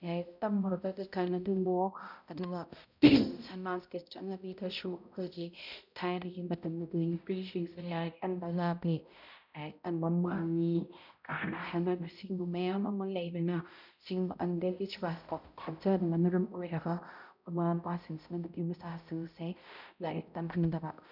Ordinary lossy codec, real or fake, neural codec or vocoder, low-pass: none; fake; codec, 16 kHz, 0.5 kbps, X-Codec, HuBERT features, trained on balanced general audio; 5.4 kHz